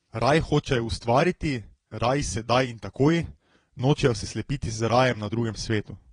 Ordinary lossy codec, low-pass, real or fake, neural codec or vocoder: AAC, 32 kbps; 9.9 kHz; fake; vocoder, 22.05 kHz, 80 mel bands, Vocos